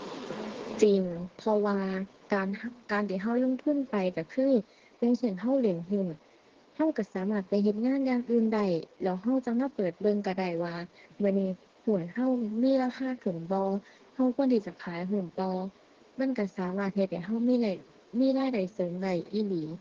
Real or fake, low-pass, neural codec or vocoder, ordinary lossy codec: fake; 7.2 kHz; codec, 16 kHz, 4 kbps, FreqCodec, smaller model; Opus, 16 kbps